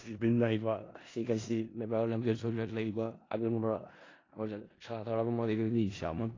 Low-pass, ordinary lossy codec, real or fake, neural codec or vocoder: 7.2 kHz; AAC, 32 kbps; fake; codec, 16 kHz in and 24 kHz out, 0.4 kbps, LongCat-Audio-Codec, four codebook decoder